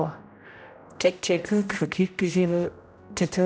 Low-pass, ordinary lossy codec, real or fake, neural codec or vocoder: none; none; fake; codec, 16 kHz, 0.5 kbps, X-Codec, HuBERT features, trained on general audio